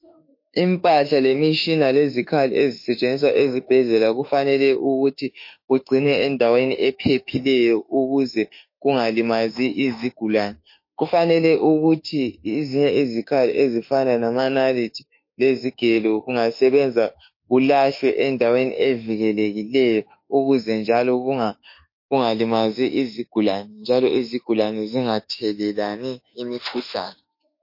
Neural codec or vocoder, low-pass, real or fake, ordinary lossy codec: autoencoder, 48 kHz, 32 numbers a frame, DAC-VAE, trained on Japanese speech; 5.4 kHz; fake; MP3, 32 kbps